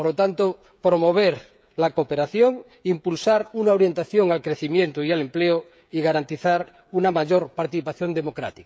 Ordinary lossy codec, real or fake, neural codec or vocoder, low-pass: none; fake; codec, 16 kHz, 16 kbps, FreqCodec, smaller model; none